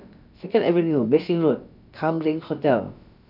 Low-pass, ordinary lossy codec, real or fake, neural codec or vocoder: 5.4 kHz; none; fake; codec, 16 kHz, about 1 kbps, DyCAST, with the encoder's durations